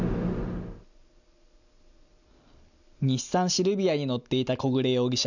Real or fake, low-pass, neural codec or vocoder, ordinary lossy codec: real; 7.2 kHz; none; none